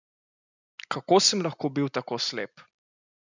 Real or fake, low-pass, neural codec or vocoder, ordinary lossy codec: real; 7.2 kHz; none; none